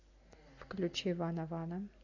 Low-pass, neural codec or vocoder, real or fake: 7.2 kHz; none; real